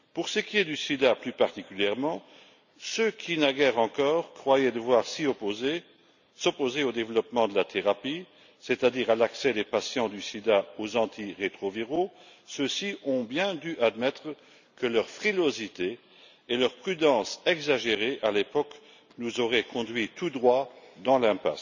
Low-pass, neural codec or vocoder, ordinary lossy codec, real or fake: 7.2 kHz; none; none; real